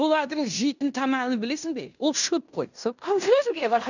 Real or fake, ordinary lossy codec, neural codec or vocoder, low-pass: fake; none; codec, 16 kHz in and 24 kHz out, 0.9 kbps, LongCat-Audio-Codec, fine tuned four codebook decoder; 7.2 kHz